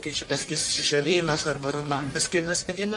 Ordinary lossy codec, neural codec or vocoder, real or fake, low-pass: MP3, 48 kbps; codec, 44.1 kHz, 1.7 kbps, Pupu-Codec; fake; 10.8 kHz